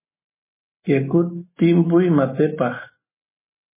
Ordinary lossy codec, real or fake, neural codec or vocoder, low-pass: MP3, 16 kbps; real; none; 3.6 kHz